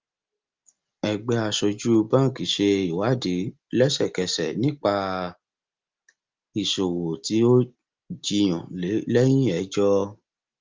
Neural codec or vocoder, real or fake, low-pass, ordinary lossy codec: none; real; 7.2 kHz; Opus, 32 kbps